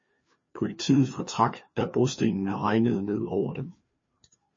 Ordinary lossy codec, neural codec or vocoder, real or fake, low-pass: MP3, 32 kbps; codec, 16 kHz, 2 kbps, FreqCodec, larger model; fake; 7.2 kHz